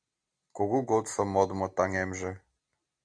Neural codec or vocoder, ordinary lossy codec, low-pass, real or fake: none; AAC, 48 kbps; 9.9 kHz; real